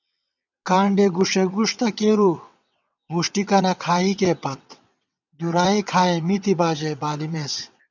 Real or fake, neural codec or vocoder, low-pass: fake; vocoder, 22.05 kHz, 80 mel bands, WaveNeXt; 7.2 kHz